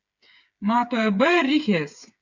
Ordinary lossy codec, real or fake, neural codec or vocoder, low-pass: MP3, 64 kbps; fake; codec, 16 kHz, 8 kbps, FreqCodec, smaller model; 7.2 kHz